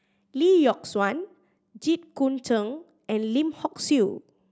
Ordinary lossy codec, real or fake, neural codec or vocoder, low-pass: none; real; none; none